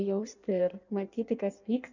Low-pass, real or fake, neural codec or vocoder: 7.2 kHz; fake; codec, 16 kHz in and 24 kHz out, 1.1 kbps, FireRedTTS-2 codec